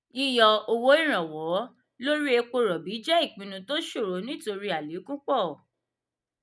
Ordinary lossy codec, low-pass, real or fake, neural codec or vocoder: none; none; real; none